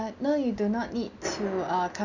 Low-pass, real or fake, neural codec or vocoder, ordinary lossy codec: 7.2 kHz; real; none; none